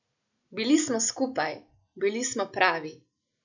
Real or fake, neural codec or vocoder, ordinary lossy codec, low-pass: real; none; none; 7.2 kHz